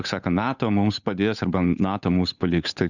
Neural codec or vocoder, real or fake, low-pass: codec, 16 kHz, 8 kbps, FunCodec, trained on Chinese and English, 25 frames a second; fake; 7.2 kHz